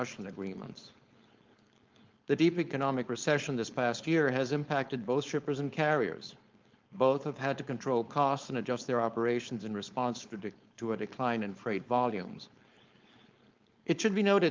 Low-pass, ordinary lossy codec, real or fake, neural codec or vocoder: 7.2 kHz; Opus, 24 kbps; fake; codec, 16 kHz, 4.8 kbps, FACodec